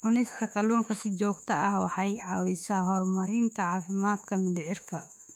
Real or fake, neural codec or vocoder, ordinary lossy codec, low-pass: fake; autoencoder, 48 kHz, 32 numbers a frame, DAC-VAE, trained on Japanese speech; none; 19.8 kHz